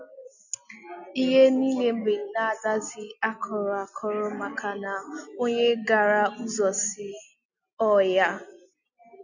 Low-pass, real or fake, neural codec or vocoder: 7.2 kHz; real; none